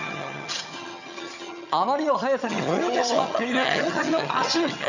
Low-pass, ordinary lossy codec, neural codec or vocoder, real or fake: 7.2 kHz; none; vocoder, 22.05 kHz, 80 mel bands, HiFi-GAN; fake